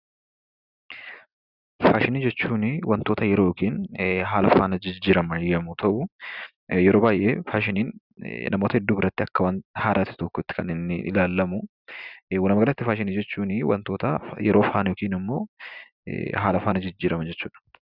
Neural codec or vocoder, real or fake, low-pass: none; real; 5.4 kHz